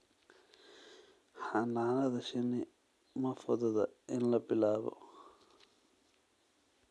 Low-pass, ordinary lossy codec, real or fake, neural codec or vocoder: none; none; real; none